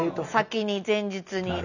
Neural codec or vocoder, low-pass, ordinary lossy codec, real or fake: none; 7.2 kHz; none; real